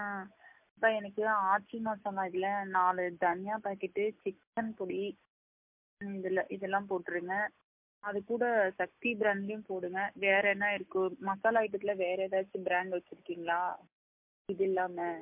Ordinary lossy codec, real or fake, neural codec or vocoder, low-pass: MP3, 32 kbps; real; none; 3.6 kHz